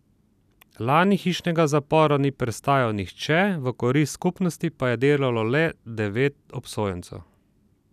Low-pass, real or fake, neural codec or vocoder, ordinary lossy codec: 14.4 kHz; real; none; none